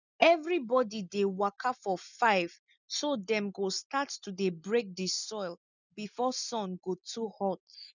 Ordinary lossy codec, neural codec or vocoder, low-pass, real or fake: none; none; 7.2 kHz; real